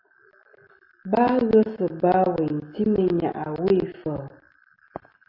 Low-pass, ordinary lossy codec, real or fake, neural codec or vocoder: 5.4 kHz; AAC, 32 kbps; real; none